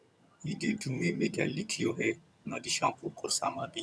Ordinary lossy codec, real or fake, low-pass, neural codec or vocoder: none; fake; none; vocoder, 22.05 kHz, 80 mel bands, HiFi-GAN